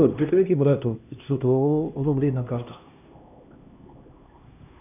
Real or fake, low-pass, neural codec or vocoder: fake; 3.6 kHz; codec, 16 kHz, 1 kbps, X-Codec, HuBERT features, trained on LibriSpeech